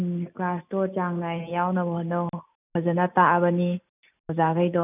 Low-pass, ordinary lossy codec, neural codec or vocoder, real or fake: 3.6 kHz; none; none; real